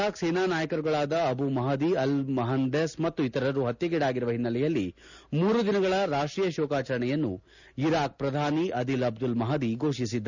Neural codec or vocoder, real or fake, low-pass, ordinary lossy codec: none; real; 7.2 kHz; none